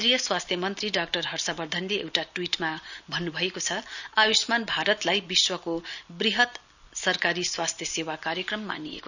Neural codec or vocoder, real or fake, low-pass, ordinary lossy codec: none; real; 7.2 kHz; none